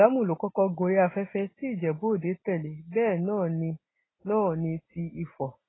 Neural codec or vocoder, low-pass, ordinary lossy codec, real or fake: none; 7.2 kHz; AAC, 16 kbps; real